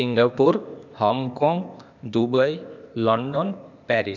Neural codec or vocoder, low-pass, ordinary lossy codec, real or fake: codec, 16 kHz, 0.8 kbps, ZipCodec; 7.2 kHz; none; fake